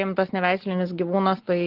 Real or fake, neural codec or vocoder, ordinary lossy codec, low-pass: real; none; Opus, 16 kbps; 5.4 kHz